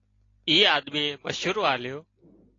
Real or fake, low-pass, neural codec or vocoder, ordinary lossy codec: real; 7.2 kHz; none; AAC, 32 kbps